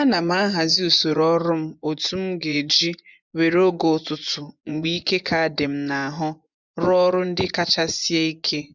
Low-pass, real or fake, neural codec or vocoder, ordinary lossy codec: 7.2 kHz; fake; vocoder, 24 kHz, 100 mel bands, Vocos; none